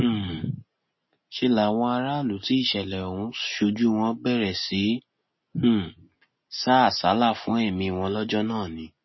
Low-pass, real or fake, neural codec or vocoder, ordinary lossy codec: 7.2 kHz; real; none; MP3, 24 kbps